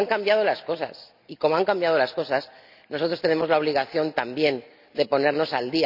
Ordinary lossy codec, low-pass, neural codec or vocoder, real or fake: none; 5.4 kHz; none; real